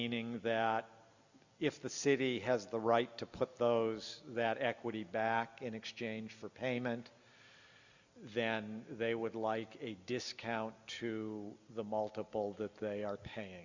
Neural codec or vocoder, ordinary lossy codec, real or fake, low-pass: none; Opus, 64 kbps; real; 7.2 kHz